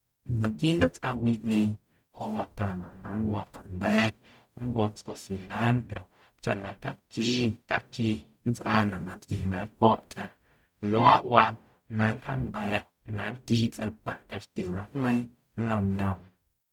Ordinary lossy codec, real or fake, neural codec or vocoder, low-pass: MP3, 96 kbps; fake; codec, 44.1 kHz, 0.9 kbps, DAC; 19.8 kHz